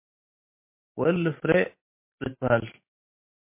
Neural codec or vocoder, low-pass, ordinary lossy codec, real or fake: vocoder, 44.1 kHz, 128 mel bands every 512 samples, BigVGAN v2; 3.6 kHz; MP3, 24 kbps; fake